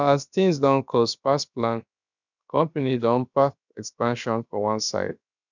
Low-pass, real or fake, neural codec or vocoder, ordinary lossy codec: 7.2 kHz; fake; codec, 16 kHz, about 1 kbps, DyCAST, with the encoder's durations; none